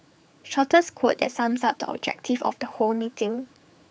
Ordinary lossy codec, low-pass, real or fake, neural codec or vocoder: none; none; fake; codec, 16 kHz, 4 kbps, X-Codec, HuBERT features, trained on general audio